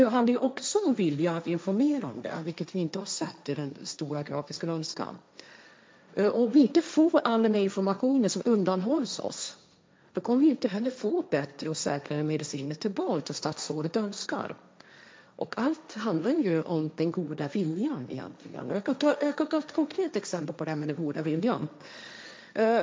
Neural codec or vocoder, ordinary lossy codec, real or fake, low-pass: codec, 16 kHz, 1.1 kbps, Voila-Tokenizer; none; fake; none